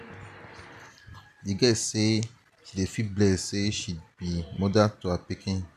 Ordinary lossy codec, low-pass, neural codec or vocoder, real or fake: none; 14.4 kHz; none; real